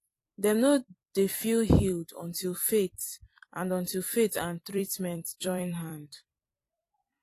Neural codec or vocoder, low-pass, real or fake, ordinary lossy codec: vocoder, 44.1 kHz, 128 mel bands every 256 samples, BigVGAN v2; 14.4 kHz; fake; AAC, 48 kbps